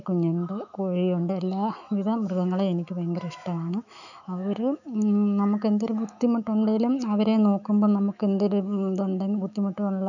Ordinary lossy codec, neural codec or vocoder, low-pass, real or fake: none; autoencoder, 48 kHz, 128 numbers a frame, DAC-VAE, trained on Japanese speech; 7.2 kHz; fake